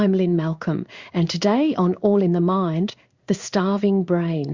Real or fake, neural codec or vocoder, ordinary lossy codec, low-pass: fake; codec, 16 kHz in and 24 kHz out, 1 kbps, XY-Tokenizer; Opus, 64 kbps; 7.2 kHz